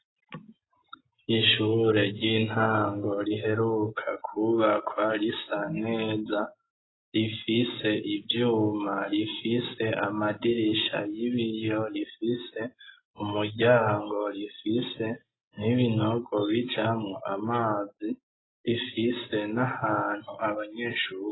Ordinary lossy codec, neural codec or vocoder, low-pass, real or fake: AAC, 16 kbps; none; 7.2 kHz; real